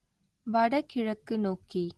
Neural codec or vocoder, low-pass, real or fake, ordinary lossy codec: none; 10.8 kHz; real; Opus, 16 kbps